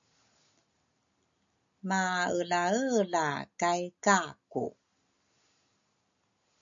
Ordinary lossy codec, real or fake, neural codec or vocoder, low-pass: MP3, 64 kbps; real; none; 7.2 kHz